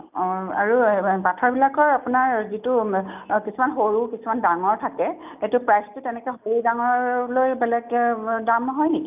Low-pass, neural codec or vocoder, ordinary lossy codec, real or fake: 3.6 kHz; none; none; real